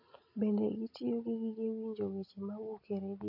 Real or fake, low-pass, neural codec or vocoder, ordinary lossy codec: real; 5.4 kHz; none; AAC, 32 kbps